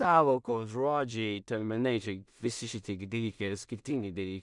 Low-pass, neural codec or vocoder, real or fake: 10.8 kHz; codec, 16 kHz in and 24 kHz out, 0.4 kbps, LongCat-Audio-Codec, two codebook decoder; fake